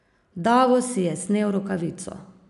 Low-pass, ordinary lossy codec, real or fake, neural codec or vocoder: 10.8 kHz; none; real; none